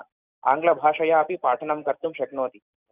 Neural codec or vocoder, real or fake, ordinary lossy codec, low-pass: none; real; none; 3.6 kHz